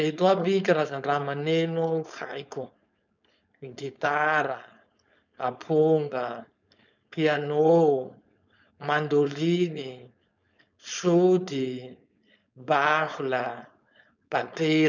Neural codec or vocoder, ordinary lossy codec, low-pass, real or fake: codec, 16 kHz, 4.8 kbps, FACodec; none; 7.2 kHz; fake